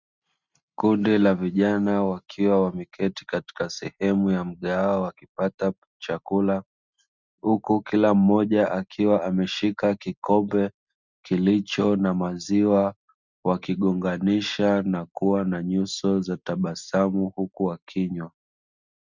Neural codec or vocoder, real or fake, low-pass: none; real; 7.2 kHz